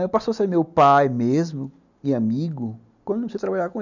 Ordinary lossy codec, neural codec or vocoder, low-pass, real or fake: none; none; 7.2 kHz; real